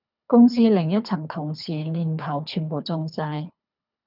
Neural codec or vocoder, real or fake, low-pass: codec, 24 kHz, 3 kbps, HILCodec; fake; 5.4 kHz